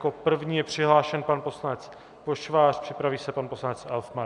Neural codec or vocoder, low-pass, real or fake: none; 10.8 kHz; real